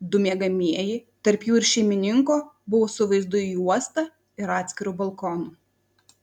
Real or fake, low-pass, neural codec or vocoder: real; 19.8 kHz; none